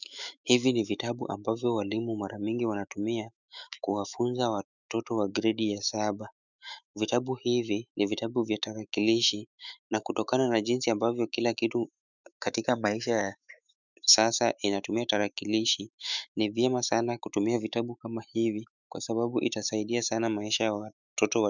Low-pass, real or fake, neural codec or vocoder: 7.2 kHz; fake; autoencoder, 48 kHz, 128 numbers a frame, DAC-VAE, trained on Japanese speech